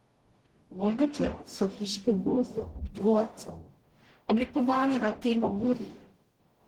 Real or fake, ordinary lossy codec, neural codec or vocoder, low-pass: fake; Opus, 16 kbps; codec, 44.1 kHz, 0.9 kbps, DAC; 19.8 kHz